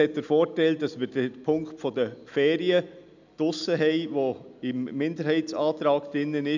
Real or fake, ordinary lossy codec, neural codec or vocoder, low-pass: real; none; none; 7.2 kHz